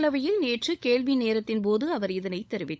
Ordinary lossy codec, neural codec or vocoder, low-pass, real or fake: none; codec, 16 kHz, 16 kbps, FunCodec, trained on LibriTTS, 50 frames a second; none; fake